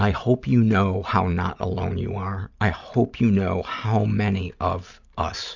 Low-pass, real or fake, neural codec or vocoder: 7.2 kHz; real; none